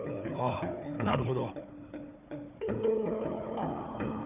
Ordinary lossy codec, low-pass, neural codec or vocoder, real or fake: none; 3.6 kHz; codec, 16 kHz, 4 kbps, FunCodec, trained on Chinese and English, 50 frames a second; fake